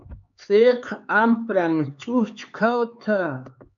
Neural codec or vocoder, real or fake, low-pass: codec, 16 kHz, 4 kbps, X-Codec, HuBERT features, trained on LibriSpeech; fake; 7.2 kHz